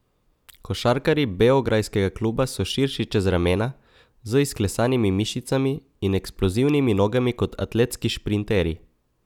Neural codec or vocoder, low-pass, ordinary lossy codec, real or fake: none; 19.8 kHz; none; real